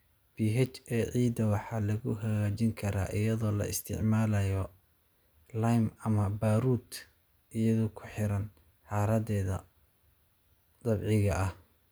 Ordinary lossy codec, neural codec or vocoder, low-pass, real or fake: none; none; none; real